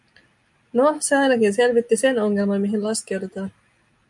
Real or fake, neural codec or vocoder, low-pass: real; none; 10.8 kHz